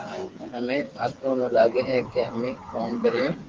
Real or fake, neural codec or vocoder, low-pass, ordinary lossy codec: fake; codec, 16 kHz, 4 kbps, FreqCodec, smaller model; 7.2 kHz; Opus, 32 kbps